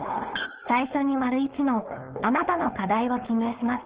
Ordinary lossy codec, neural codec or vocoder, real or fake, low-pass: Opus, 16 kbps; codec, 16 kHz, 4.8 kbps, FACodec; fake; 3.6 kHz